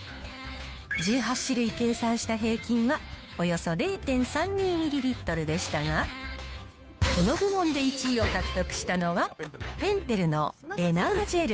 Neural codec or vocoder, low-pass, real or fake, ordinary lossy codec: codec, 16 kHz, 2 kbps, FunCodec, trained on Chinese and English, 25 frames a second; none; fake; none